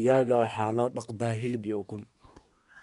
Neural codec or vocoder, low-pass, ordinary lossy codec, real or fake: codec, 24 kHz, 1 kbps, SNAC; 10.8 kHz; none; fake